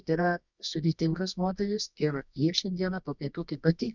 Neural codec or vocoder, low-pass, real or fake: codec, 24 kHz, 0.9 kbps, WavTokenizer, medium music audio release; 7.2 kHz; fake